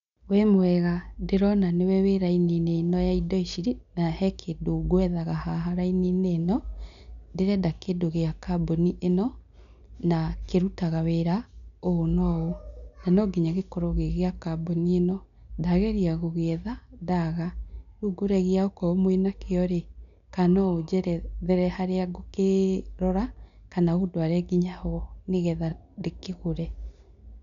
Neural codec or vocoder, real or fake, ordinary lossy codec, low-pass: none; real; none; 7.2 kHz